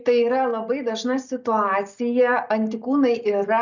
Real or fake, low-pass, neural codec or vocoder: real; 7.2 kHz; none